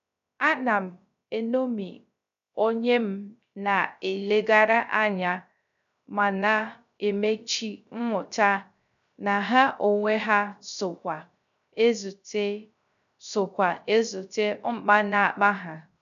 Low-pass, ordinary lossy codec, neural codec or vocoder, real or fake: 7.2 kHz; none; codec, 16 kHz, 0.3 kbps, FocalCodec; fake